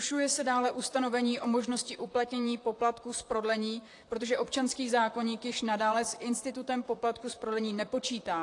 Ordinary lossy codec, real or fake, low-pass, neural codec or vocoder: AAC, 48 kbps; fake; 10.8 kHz; vocoder, 44.1 kHz, 128 mel bands, Pupu-Vocoder